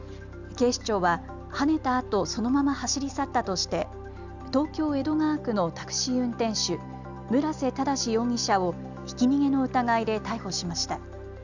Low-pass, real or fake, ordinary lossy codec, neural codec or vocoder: 7.2 kHz; real; none; none